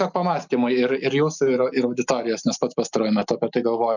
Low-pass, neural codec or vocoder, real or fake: 7.2 kHz; none; real